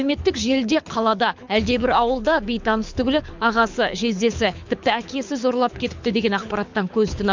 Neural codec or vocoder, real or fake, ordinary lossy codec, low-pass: codec, 24 kHz, 6 kbps, HILCodec; fake; MP3, 64 kbps; 7.2 kHz